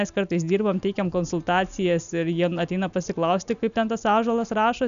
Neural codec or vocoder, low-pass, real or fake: none; 7.2 kHz; real